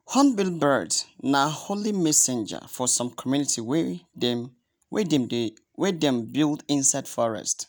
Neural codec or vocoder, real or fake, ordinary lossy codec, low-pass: none; real; none; none